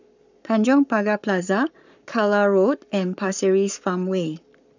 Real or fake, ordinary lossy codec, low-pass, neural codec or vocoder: fake; none; 7.2 kHz; codec, 44.1 kHz, 7.8 kbps, Pupu-Codec